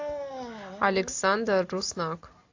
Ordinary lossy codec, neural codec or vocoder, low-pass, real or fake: AAC, 48 kbps; none; 7.2 kHz; real